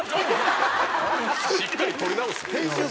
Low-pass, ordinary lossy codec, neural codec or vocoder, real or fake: none; none; none; real